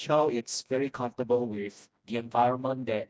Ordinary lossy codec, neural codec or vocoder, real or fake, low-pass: none; codec, 16 kHz, 1 kbps, FreqCodec, smaller model; fake; none